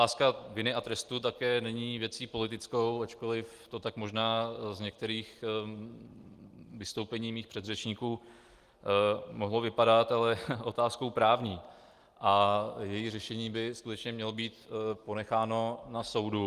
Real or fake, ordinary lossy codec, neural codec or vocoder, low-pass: real; Opus, 24 kbps; none; 14.4 kHz